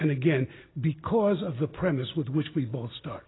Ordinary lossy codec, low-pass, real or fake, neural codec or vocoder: AAC, 16 kbps; 7.2 kHz; real; none